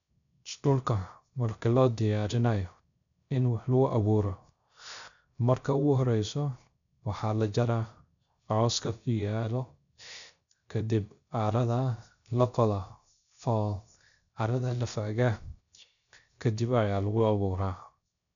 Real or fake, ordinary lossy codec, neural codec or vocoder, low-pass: fake; none; codec, 16 kHz, 0.3 kbps, FocalCodec; 7.2 kHz